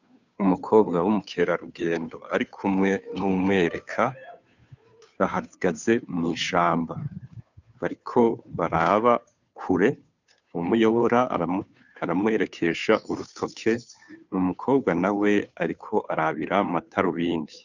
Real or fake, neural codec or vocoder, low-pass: fake; codec, 16 kHz, 2 kbps, FunCodec, trained on Chinese and English, 25 frames a second; 7.2 kHz